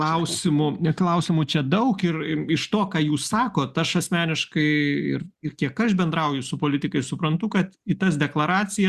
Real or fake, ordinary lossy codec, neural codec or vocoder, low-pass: real; Opus, 64 kbps; none; 14.4 kHz